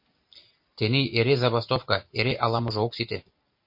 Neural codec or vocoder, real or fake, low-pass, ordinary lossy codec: none; real; 5.4 kHz; MP3, 32 kbps